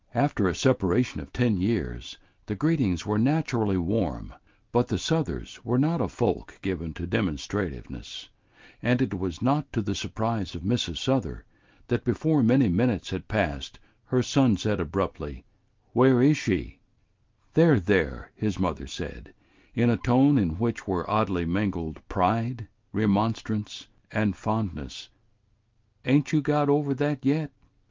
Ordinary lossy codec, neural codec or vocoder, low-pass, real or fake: Opus, 32 kbps; none; 7.2 kHz; real